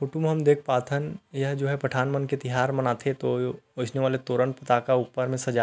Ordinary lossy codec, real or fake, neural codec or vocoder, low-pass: none; real; none; none